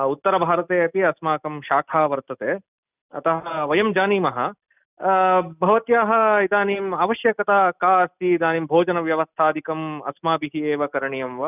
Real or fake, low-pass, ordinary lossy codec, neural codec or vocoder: real; 3.6 kHz; none; none